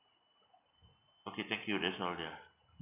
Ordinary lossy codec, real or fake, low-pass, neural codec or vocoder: AAC, 24 kbps; real; 3.6 kHz; none